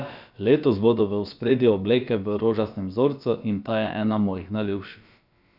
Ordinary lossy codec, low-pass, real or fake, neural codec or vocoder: none; 5.4 kHz; fake; codec, 16 kHz, about 1 kbps, DyCAST, with the encoder's durations